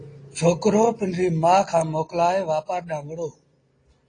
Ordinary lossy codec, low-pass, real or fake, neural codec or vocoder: AAC, 32 kbps; 9.9 kHz; real; none